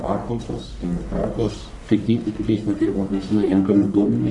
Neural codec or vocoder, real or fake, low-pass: codec, 44.1 kHz, 1.7 kbps, Pupu-Codec; fake; 10.8 kHz